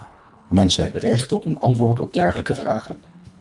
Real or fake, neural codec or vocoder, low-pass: fake; codec, 24 kHz, 1.5 kbps, HILCodec; 10.8 kHz